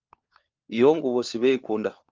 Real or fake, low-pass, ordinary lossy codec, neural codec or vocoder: fake; 7.2 kHz; Opus, 24 kbps; codec, 16 kHz, 4 kbps, FunCodec, trained on LibriTTS, 50 frames a second